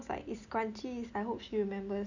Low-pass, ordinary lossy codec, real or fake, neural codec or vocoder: 7.2 kHz; none; real; none